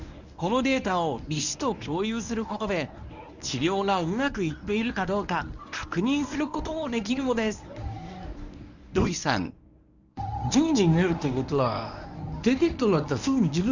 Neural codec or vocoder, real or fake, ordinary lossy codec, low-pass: codec, 24 kHz, 0.9 kbps, WavTokenizer, medium speech release version 1; fake; none; 7.2 kHz